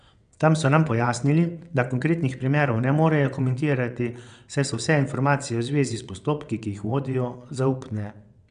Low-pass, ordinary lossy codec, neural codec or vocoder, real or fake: 9.9 kHz; none; vocoder, 22.05 kHz, 80 mel bands, WaveNeXt; fake